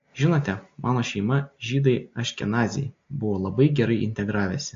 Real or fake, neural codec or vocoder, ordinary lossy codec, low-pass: real; none; AAC, 48 kbps; 7.2 kHz